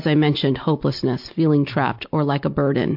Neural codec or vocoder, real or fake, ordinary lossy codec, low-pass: none; real; MP3, 48 kbps; 5.4 kHz